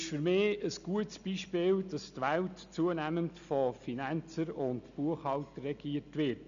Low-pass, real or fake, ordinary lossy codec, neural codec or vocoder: 7.2 kHz; real; none; none